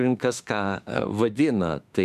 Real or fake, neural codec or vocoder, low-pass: fake; autoencoder, 48 kHz, 32 numbers a frame, DAC-VAE, trained on Japanese speech; 14.4 kHz